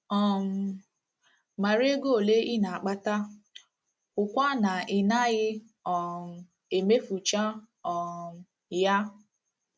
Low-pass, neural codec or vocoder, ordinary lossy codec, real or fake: none; none; none; real